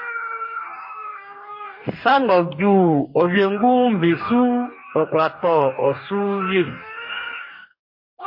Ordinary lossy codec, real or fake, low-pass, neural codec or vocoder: MP3, 32 kbps; fake; 5.4 kHz; codec, 44.1 kHz, 2.6 kbps, DAC